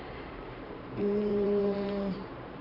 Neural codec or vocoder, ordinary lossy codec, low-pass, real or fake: vocoder, 44.1 kHz, 128 mel bands every 512 samples, BigVGAN v2; none; 5.4 kHz; fake